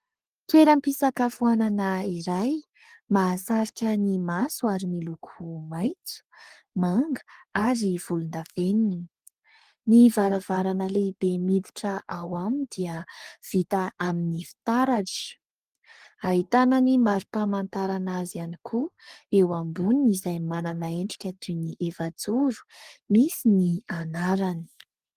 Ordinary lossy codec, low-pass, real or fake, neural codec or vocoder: Opus, 24 kbps; 14.4 kHz; fake; codec, 44.1 kHz, 3.4 kbps, Pupu-Codec